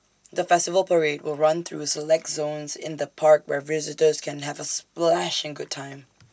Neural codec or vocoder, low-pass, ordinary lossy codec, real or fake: none; none; none; real